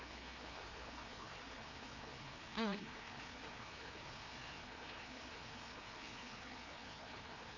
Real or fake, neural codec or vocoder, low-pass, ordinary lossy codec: fake; codec, 16 kHz, 2 kbps, FreqCodec, larger model; 7.2 kHz; MP3, 32 kbps